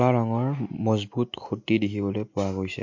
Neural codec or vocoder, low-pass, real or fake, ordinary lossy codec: none; 7.2 kHz; real; MP3, 48 kbps